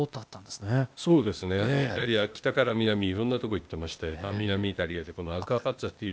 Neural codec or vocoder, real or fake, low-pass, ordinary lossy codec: codec, 16 kHz, 0.8 kbps, ZipCodec; fake; none; none